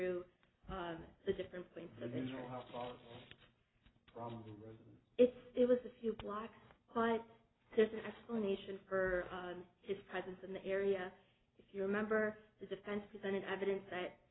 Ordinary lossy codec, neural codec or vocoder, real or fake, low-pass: AAC, 16 kbps; none; real; 7.2 kHz